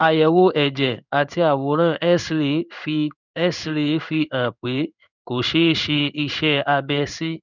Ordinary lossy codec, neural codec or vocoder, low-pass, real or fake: none; codec, 16 kHz in and 24 kHz out, 1 kbps, XY-Tokenizer; 7.2 kHz; fake